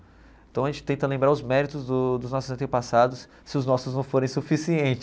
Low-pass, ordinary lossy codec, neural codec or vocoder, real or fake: none; none; none; real